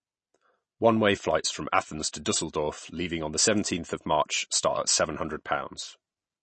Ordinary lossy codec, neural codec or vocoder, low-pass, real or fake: MP3, 32 kbps; none; 10.8 kHz; real